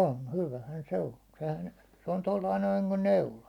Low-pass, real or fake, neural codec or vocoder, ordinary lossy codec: 19.8 kHz; real; none; none